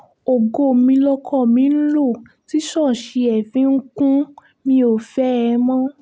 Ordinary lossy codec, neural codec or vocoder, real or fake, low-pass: none; none; real; none